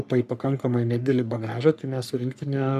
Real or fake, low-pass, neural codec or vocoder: fake; 14.4 kHz; codec, 44.1 kHz, 3.4 kbps, Pupu-Codec